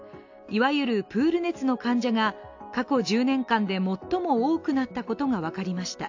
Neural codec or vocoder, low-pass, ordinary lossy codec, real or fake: none; 7.2 kHz; AAC, 48 kbps; real